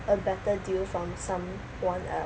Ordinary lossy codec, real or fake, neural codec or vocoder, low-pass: none; real; none; none